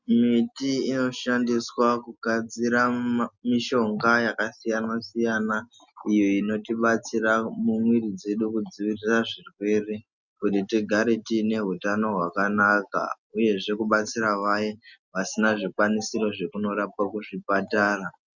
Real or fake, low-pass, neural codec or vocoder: real; 7.2 kHz; none